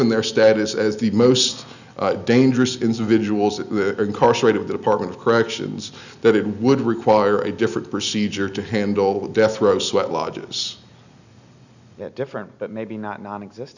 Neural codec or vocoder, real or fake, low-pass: none; real; 7.2 kHz